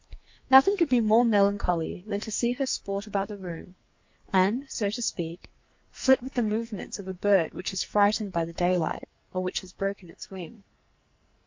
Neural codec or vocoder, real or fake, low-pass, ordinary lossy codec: codec, 44.1 kHz, 2.6 kbps, SNAC; fake; 7.2 kHz; MP3, 48 kbps